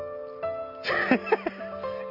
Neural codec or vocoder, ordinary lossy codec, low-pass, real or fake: none; none; 5.4 kHz; real